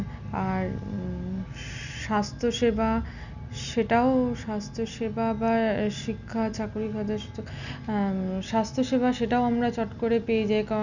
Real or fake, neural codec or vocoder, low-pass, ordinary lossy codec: real; none; 7.2 kHz; none